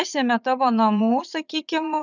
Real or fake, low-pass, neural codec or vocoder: fake; 7.2 kHz; vocoder, 22.05 kHz, 80 mel bands, Vocos